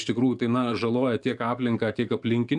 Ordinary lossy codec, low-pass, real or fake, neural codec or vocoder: MP3, 96 kbps; 10.8 kHz; fake; codec, 44.1 kHz, 7.8 kbps, DAC